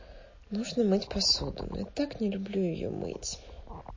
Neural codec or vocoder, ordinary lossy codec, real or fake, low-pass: none; MP3, 32 kbps; real; 7.2 kHz